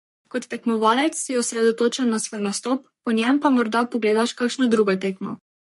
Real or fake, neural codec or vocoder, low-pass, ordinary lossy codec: fake; codec, 44.1 kHz, 3.4 kbps, Pupu-Codec; 14.4 kHz; MP3, 48 kbps